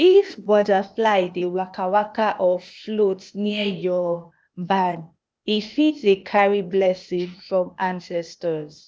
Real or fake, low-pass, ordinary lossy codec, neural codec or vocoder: fake; none; none; codec, 16 kHz, 0.8 kbps, ZipCodec